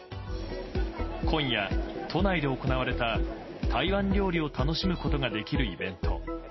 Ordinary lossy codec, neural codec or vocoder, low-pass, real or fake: MP3, 24 kbps; none; 7.2 kHz; real